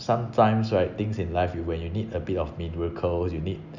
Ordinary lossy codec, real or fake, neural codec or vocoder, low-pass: none; real; none; 7.2 kHz